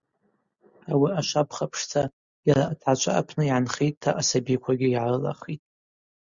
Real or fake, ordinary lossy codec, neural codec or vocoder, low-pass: real; Opus, 64 kbps; none; 7.2 kHz